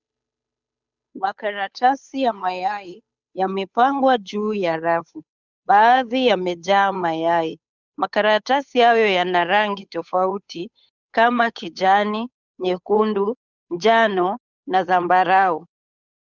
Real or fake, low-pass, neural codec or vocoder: fake; 7.2 kHz; codec, 16 kHz, 8 kbps, FunCodec, trained on Chinese and English, 25 frames a second